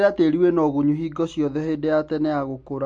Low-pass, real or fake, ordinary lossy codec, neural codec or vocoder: 9.9 kHz; real; MP3, 48 kbps; none